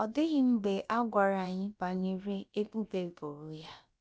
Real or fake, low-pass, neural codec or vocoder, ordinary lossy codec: fake; none; codec, 16 kHz, about 1 kbps, DyCAST, with the encoder's durations; none